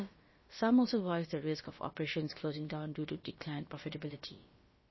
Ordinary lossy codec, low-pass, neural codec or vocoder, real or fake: MP3, 24 kbps; 7.2 kHz; codec, 16 kHz, about 1 kbps, DyCAST, with the encoder's durations; fake